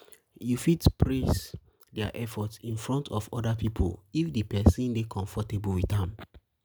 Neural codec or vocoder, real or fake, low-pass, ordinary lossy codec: none; real; none; none